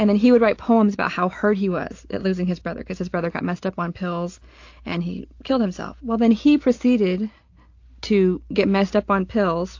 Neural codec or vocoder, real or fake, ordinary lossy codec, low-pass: none; real; AAC, 48 kbps; 7.2 kHz